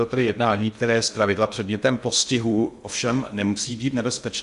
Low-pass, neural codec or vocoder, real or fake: 10.8 kHz; codec, 16 kHz in and 24 kHz out, 0.6 kbps, FocalCodec, streaming, 2048 codes; fake